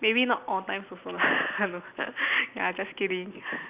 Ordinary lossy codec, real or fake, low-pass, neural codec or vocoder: Opus, 64 kbps; real; 3.6 kHz; none